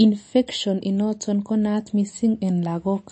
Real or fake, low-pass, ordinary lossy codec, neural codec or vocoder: real; 9.9 kHz; MP3, 32 kbps; none